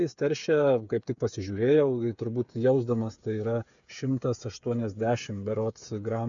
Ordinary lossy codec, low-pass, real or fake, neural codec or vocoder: MP3, 96 kbps; 7.2 kHz; fake; codec, 16 kHz, 8 kbps, FreqCodec, smaller model